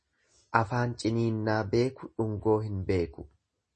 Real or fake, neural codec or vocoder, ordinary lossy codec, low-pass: real; none; MP3, 32 kbps; 10.8 kHz